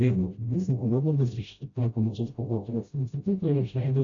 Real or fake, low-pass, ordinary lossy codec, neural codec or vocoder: fake; 7.2 kHz; AAC, 48 kbps; codec, 16 kHz, 0.5 kbps, FreqCodec, smaller model